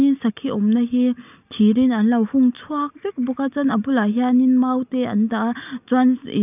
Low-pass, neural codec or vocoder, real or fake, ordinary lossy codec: 3.6 kHz; none; real; none